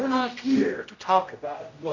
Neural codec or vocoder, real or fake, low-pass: codec, 16 kHz, 0.5 kbps, X-Codec, HuBERT features, trained on general audio; fake; 7.2 kHz